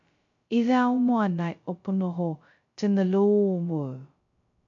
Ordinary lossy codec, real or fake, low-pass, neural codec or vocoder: MP3, 64 kbps; fake; 7.2 kHz; codec, 16 kHz, 0.2 kbps, FocalCodec